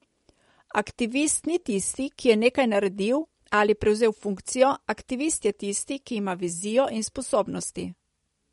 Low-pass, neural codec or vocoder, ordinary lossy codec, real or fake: 19.8 kHz; none; MP3, 48 kbps; real